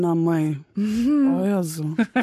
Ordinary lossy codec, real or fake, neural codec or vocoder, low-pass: MP3, 64 kbps; fake; codec, 44.1 kHz, 7.8 kbps, Pupu-Codec; 14.4 kHz